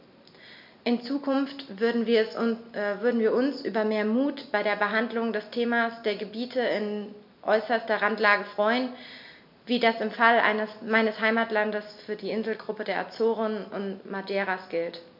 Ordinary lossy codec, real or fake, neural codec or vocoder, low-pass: MP3, 48 kbps; real; none; 5.4 kHz